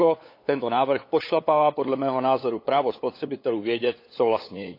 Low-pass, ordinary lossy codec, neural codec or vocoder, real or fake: 5.4 kHz; AAC, 32 kbps; codec, 16 kHz, 8 kbps, FunCodec, trained on LibriTTS, 25 frames a second; fake